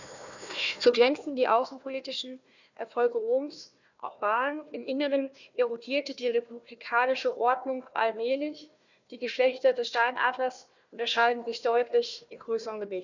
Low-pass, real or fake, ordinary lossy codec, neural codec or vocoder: 7.2 kHz; fake; none; codec, 16 kHz, 1 kbps, FunCodec, trained on Chinese and English, 50 frames a second